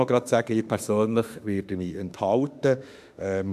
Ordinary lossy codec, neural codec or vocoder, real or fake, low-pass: AAC, 96 kbps; autoencoder, 48 kHz, 32 numbers a frame, DAC-VAE, trained on Japanese speech; fake; 14.4 kHz